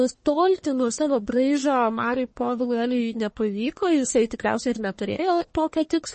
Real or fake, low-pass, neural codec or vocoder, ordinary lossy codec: fake; 10.8 kHz; codec, 44.1 kHz, 1.7 kbps, Pupu-Codec; MP3, 32 kbps